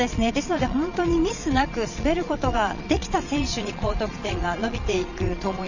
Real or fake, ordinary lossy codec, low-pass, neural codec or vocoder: fake; none; 7.2 kHz; vocoder, 22.05 kHz, 80 mel bands, Vocos